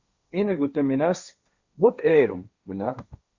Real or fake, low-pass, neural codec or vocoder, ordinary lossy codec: fake; 7.2 kHz; codec, 16 kHz, 1.1 kbps, Voila-Tokenizer; Opus, 64 kbps